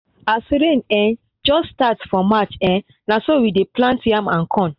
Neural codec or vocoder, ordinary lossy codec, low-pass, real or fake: none; AAC, 48 kbps; 5.4 kHz; real